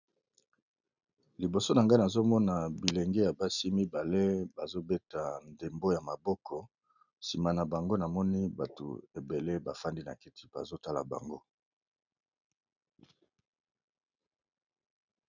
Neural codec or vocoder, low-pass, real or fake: none; 7.2 kHz; real